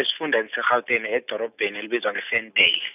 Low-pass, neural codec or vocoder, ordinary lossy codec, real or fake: 3.6 kHz; none; none; real